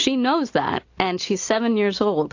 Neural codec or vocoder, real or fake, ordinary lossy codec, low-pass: none; real; AAC, 48 kbps; 7.2 kHz